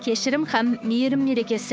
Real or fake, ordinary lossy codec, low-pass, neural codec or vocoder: fake; none; none; codec, 16 kHz, 6 kbps, DAC